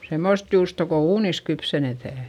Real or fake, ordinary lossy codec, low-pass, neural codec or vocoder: real; none; 19.8 kHz; none